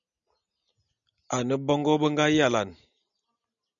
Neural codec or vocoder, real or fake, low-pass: none; real; 7.2 kHz